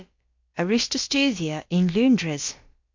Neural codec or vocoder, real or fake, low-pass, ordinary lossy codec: codec, 16 kHz, about 1 kbps, DyCAST, with the encoder's durations; fake; 7.2 kHz; MP3, 48 kbps